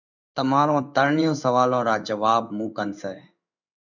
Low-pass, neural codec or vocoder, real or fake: 7.2 kHz; codec, 16 kHz in and 24 kHz out, 1 kbps, XY-Tokenizer; fake